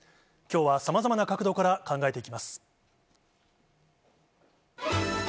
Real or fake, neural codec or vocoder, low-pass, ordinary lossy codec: real; none; none; none